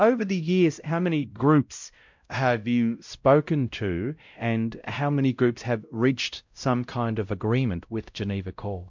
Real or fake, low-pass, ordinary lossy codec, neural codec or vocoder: fake; 7.2 kHz; MP3, 64 kbps; codec, 16 kHz, 0.5 kbps, X-Codec, WavLM features, trained on Multilingual LibriSpeech